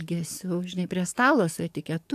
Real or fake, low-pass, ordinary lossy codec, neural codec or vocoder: fake; 14.4 kHz; AAC, 96 kbps; codec, 44.1 kHz, 7.8 kbps, DAC